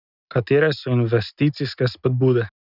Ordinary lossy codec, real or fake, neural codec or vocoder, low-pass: none; real; none; 5.4 kHz